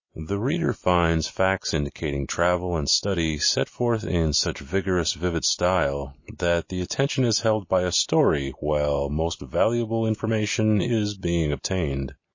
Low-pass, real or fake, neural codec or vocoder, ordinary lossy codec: 7.2 kHz; real; none; MP3, 32 kbps